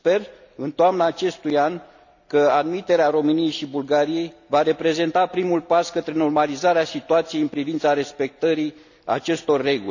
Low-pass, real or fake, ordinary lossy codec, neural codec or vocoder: 7.2 kHz; real; none; none